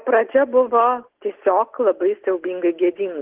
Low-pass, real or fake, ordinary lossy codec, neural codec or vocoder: 3.6 kHz; real; Opus, 16 kbps; none